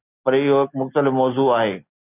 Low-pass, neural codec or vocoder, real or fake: 3.6 kHz; none; real